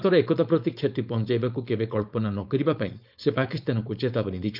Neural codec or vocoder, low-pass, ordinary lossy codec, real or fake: codec, 16 kHz, 4.8 kbps, FACodec; 5.4 kHz; none; fake